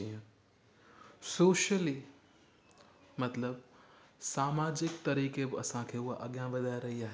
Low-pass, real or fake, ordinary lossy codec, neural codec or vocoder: none; real; none; none